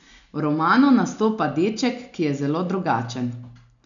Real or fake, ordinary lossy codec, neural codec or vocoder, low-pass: real; none; none; 7.2 kHz